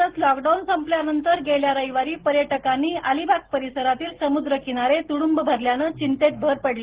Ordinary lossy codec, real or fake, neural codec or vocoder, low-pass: Opus, 16 kbps; real; none; 3.6 kHz